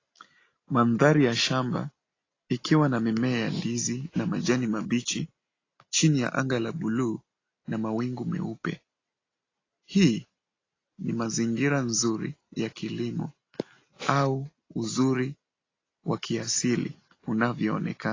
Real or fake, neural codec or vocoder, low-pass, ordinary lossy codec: real; none; 7.2 kHz; AAC, 32 kbps